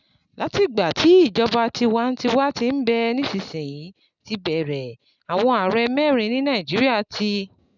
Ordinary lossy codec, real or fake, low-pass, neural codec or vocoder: none; real; 7.2 kHz; none